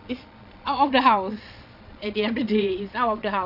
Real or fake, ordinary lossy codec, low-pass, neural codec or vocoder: fake; none; 5.4 kHz; vocoder, 22.05 kHz, 80 mel bands, Vocos